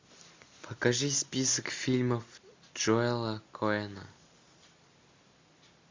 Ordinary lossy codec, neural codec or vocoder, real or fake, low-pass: MP3, 64 kbps; none; real; 7.2 kHz